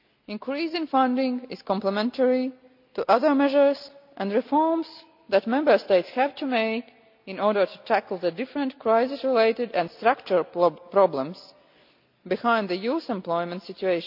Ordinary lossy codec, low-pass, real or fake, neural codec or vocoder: AAC, 48 kbps; 5.4 kHz; real; none